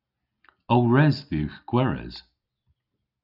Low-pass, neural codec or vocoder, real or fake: 5.4 kHz; none; real